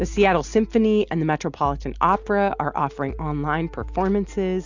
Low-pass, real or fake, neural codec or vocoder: 7.2 kHz; real; none